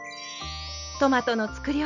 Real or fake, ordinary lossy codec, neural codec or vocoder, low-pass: real; none; none; 7.2 kHz